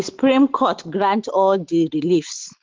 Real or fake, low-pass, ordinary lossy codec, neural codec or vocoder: real; 7.2 kHz; Opus, 16 kbps; none